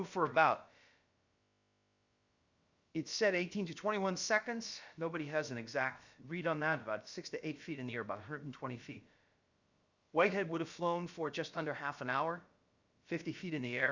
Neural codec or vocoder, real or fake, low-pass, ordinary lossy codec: codec, 16 kHz, about 1 kbps, DyCAST, with the encoder's durations; fake; 7.2 kHz; Opus, 64 kbps